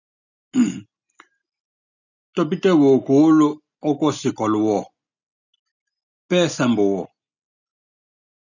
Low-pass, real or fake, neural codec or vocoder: 7.2 kHz; real; none